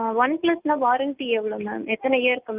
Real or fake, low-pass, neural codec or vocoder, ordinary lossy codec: real; 3.6 kHz; none; Opus, 24 kbps